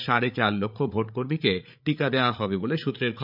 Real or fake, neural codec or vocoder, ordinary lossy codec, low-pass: fake; codec, 16 kHz, 8 kbps, FreqCodec, larger model; none; 5.4 kHz